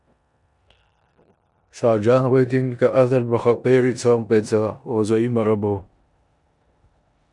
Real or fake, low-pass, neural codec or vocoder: fake; 10.8 kHz; codec, 16 kHz in and 24 kHz out, 0.9 kbps, LongCat-Audio-Codec, four codebook decoder